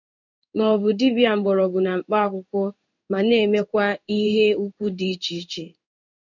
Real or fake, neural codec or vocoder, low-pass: fake; codec, 16 kHz in and 24 kHz out, 1 kbps, XY-Tokenizer; 7.2 kHz